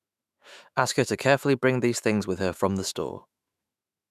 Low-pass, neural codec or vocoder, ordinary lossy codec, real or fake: 14.4 kHz; autoencoder, 48 kHz, 128 numbers a frame, DAC-VAE, trained on Japanese speech; none; fake